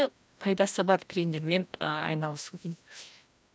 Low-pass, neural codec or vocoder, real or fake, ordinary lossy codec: none; codec, 16 kHz, 1 kbps, FreqCodec, larger model; fake; none